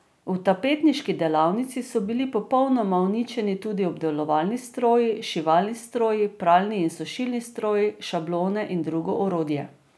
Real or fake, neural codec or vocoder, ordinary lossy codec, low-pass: real; none; none; none